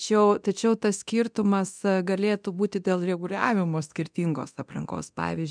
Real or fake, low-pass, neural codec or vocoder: fake; 9.9 kHz; codec, 24 kHz, 0.9 kbps, DualCodec